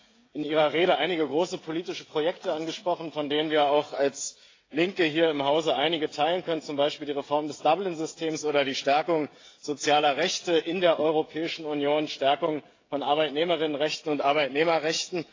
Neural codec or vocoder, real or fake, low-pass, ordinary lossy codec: codec, 16 kHz, 16 kbps, FreqCodec, smaller model; fake; 7.2 kHz; AAC, 32 kbps